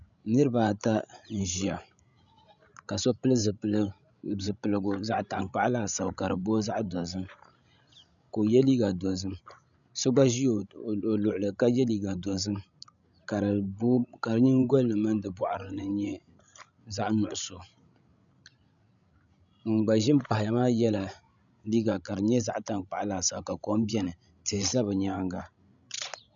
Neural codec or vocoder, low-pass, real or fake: codec, 16 kHz, 16 kbps, FreqCodec, larger model; 7.2 kHz; fake